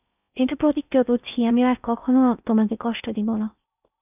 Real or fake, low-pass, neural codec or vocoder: fake; 3.6 kHz; codec, 16 kHz in and 24 kHz out, 0.6 kbps, FocalCodec, streaming, 4096 codes